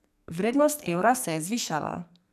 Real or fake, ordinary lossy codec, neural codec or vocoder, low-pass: fake; none; codec, 44.1 kHz, 2.6 kbps, SNAC; 14.4 kHz